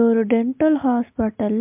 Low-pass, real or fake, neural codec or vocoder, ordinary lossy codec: 3.6 kHz; real; none; MP3, 32 kbps